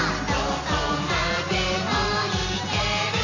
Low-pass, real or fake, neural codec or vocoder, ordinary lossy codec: 7.2 kHz; real; none; none